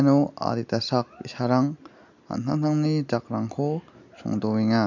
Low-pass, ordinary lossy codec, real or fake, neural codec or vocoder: 7.2 kHz; none; real; none